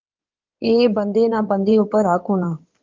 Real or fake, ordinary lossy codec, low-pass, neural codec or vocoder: fake; Opus, 32 kbps; 7.2 kHz; codec, 16 kHz in and 24 kHz out, 2.2 kbps, FireRedTTS-2 codec